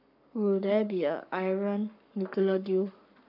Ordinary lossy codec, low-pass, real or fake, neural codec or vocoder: none; 5.4 kHz; fake; codec, 44.1 kHz, 7.8 kbps, Pupu-Codec